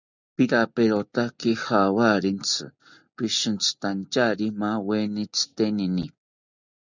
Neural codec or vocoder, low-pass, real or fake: none; 7.2 kHz; real